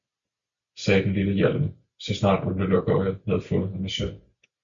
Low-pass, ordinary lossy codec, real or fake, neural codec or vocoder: 7.2 kHz; MP3, 64 kbps; real; none